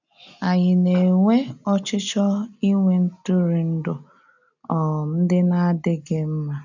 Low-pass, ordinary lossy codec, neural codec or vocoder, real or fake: 7.2 kHz; none; none; real